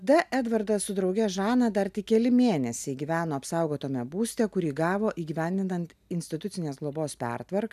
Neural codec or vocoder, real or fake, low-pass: none; real; 14.4 kHz